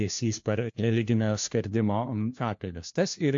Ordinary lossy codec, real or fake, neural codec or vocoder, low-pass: AAC, 64 kbps; fake; codec, 16 kHz, 1 kbps, FunCodec, trained on LibriTTS, 50 frames a second; 7.2 kHz